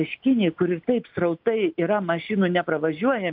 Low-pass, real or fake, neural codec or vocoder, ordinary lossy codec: 5.4 kHz; real; none; AAC, 48 kbps